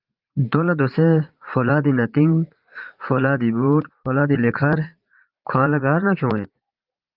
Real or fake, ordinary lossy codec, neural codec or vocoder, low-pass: fake; Opus, 32 kbps; vocoder, 44.1 kHz, 80 mel bands, Vocos; 5.4 kHz